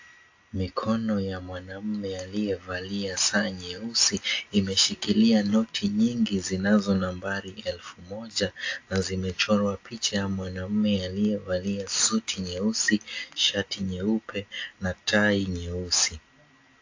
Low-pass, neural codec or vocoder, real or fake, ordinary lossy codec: 7.2 kHz; none; real; AAC, 48 kbps